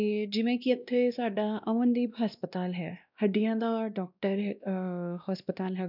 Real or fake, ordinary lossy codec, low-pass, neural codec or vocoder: fake; none; 5.4 kHz; codec, 16 kHz, 1 kbps, X-Codec, WavLM features, trained on Multilingual LibriSpeech